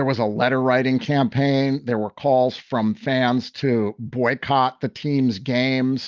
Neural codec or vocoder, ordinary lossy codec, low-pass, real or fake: none; Opus, 24 kbps; 7.2 kHz; real